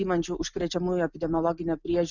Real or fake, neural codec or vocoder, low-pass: real; none; 7.2 kHz